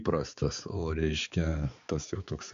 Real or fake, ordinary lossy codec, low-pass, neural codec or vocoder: fake; MP3, 64 kbps; 7.2 kHz; codec, 16 kHz, 4 kbps, X-Codec, HuBERT features, trained on balanced general audio